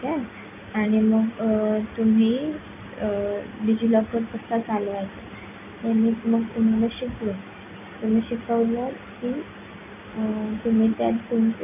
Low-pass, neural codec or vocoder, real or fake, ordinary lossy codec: 3.6 kHz; none; real; none